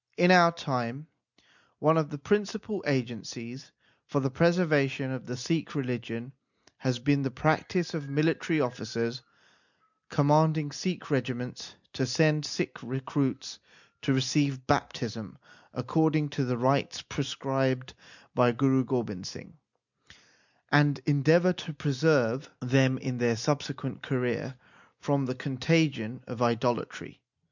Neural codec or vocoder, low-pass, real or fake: none; 7.2 kHz; real